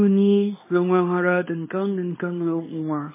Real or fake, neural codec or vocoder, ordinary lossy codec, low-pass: fake; codec, 24 kHz, 0.9 kbps, WavTokenizer, small release; MP3, 16 kbps; 3.6 kHz